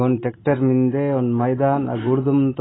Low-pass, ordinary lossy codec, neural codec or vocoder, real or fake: 7.2 kHz; AAC, 16 kbps; none; real